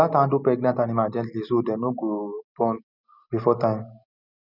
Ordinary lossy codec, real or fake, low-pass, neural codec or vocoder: none; real; 5.4 kHz; none